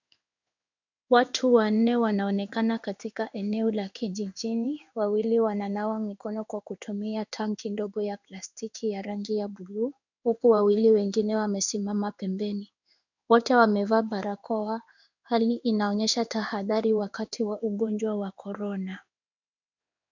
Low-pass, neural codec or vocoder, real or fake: 7.2 kHz; codec, 16 kHz in and 24 kHz out, 1 kbps, XY-Tokenizer; fake